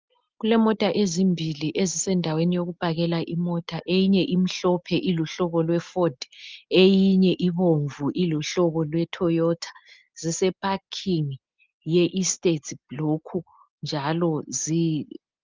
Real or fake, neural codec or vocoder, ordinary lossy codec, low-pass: real; none; Opus, 32 kbps; 7.2 kHz